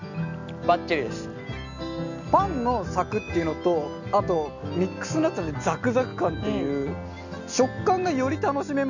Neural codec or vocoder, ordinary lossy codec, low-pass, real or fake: none; none; 7.2 kHz; real